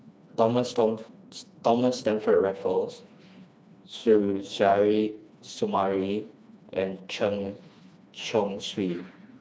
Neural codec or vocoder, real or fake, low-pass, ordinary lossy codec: codec, 16 kHz, 2 kbps, FreqCodec, smaller model; fake; none; none